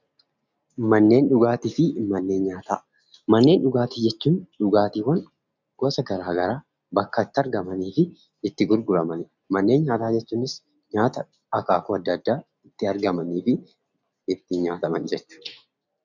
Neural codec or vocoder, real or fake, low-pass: none; real; 7.2 kHz